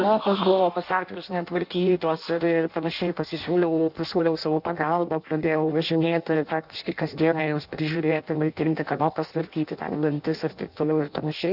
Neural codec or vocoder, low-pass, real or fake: codec, 16 kHz in and 24 kHz out, 0.6 kbps, FireRedTTS-2 codec; 5.4 kHz; fake